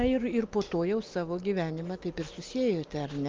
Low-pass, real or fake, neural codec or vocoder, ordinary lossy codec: 7.2 kHz; real; none; Opus, 24 kbps